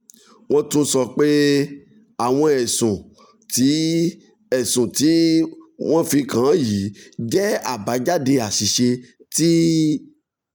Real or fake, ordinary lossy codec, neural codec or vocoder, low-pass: real; none; none; none